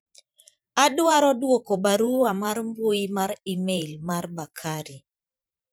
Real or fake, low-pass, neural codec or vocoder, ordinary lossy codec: fake; none; vocoder, 44.1 kHz, 128 mel bands every 512 samples, BigVGAN v2; none